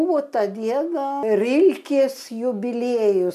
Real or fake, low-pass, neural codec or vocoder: real; 14.4 kHz; none